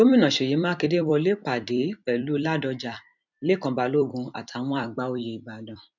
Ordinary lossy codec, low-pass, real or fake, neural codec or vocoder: none; 7.2 kHz; fake; vocoder, 44.1 kHz, 128 mel bands every 256 samples, BigVGAN v2